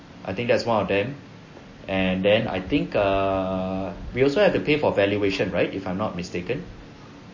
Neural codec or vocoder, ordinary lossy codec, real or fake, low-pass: none; MP3, 32 kbps; real; 7.2 kHz